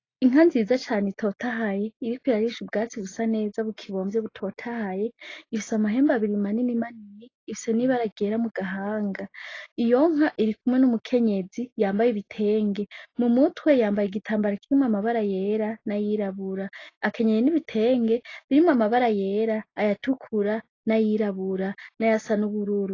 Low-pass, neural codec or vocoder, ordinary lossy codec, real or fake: 7.2 kHz; none; AAC, 32 kbps; real